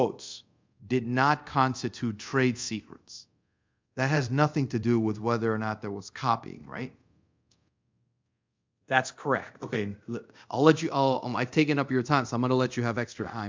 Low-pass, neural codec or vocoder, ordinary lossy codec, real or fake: 7.2 kHz; codec, 24 kHz, 0.5 kbps, DualCodec; MP3, 64 kbps; fake